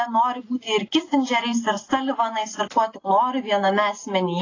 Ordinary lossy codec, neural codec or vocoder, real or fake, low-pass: AAC, 32 kbps; none; real; 7.2 kHz